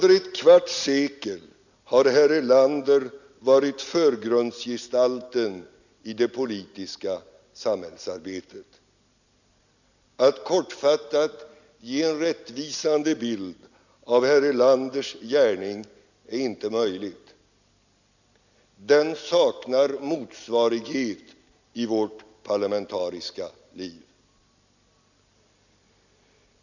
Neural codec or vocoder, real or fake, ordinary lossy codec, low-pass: none; real; none; 7.2 kHz